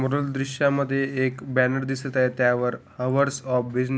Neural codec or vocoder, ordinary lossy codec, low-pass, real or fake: none; none; none; real